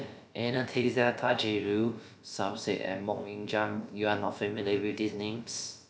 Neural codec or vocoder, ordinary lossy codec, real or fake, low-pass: codec, 16 kHz, about 1 kbps, DyCAST, with the encoder's durations; none; fake; none